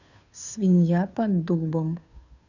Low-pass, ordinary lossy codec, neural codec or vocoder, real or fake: 7.2 kHz; none; codec, 16 kHz, 2 kbps, FunCodec, trained on Chinese and English, 25 frames a second; fake